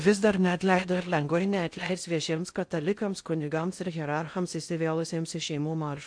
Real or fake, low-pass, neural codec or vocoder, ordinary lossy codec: fake; 9.9 kHz; codec, 16 kHz in and 24 kHz out, 0.6 kbps, FocalCodec, streaming, 4096 codes; AAC, 64 kbps